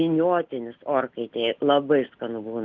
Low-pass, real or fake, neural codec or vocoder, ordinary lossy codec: 7.2 kHz; real; none; Opus, 32 kbps